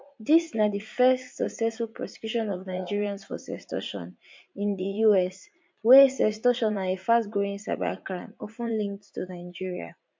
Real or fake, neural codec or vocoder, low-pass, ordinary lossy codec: fake; vocoder, 44.1 kHz, 80 mel bands, Vocos; 7.2 kHz; MP3, 48 kbps